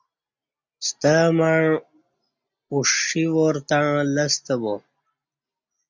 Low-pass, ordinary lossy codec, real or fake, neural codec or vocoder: 7.2 kHz; MP3, 64 kbps; real; none